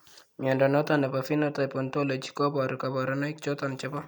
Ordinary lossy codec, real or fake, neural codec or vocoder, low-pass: none; real; none; 19.8 kHz